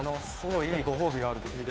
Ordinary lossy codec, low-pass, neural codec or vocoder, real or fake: none; none; codec, 16 kHz, 2 kbps, FunCodec, trained on Chinese and English, 25 frames a second; fake